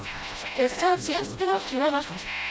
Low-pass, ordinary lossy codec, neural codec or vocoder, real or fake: none; none; codec, 16 kHz, 0.5 kbps, FreqCodec, smaller model; fake